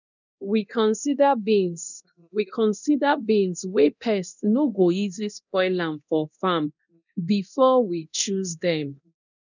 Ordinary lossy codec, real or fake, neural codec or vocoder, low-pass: none; fake; codec, 24 kHz, 0.9 kbps, DualCodec; 7.2 kHz